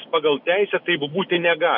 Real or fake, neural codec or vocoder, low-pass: real; none; 5.4 kHz